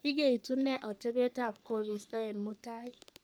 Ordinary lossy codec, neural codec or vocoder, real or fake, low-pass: none; codec, 44.1 kHz, 3.4 kbps, Pupu-Codec; fake; none